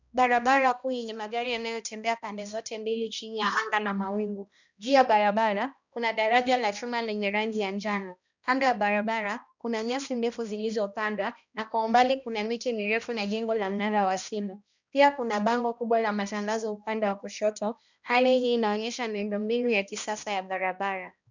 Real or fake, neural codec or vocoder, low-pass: fake; codec, 16 kHz, 1 kbps, X-Codec, HuBERT features, trained on balanced general audio; 7.2 kHz